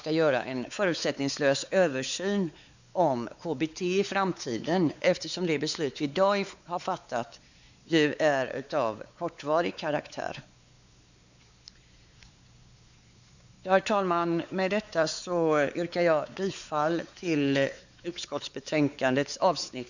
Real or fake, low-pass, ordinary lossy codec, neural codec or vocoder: fake; 7.2 kHz; none; codec, 16 kHz, 4 kbps, X-Codec, WavLM features, trained on Multilingual LibriSpeech